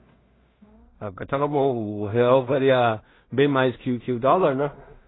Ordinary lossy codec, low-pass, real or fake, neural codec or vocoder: AAC, 16 kbps; 7.2 kHz; fake; codec, 16 kHz in and 24 kHz out, 0.4 kbps, LongCat-Audio-Codec, two codebook decoder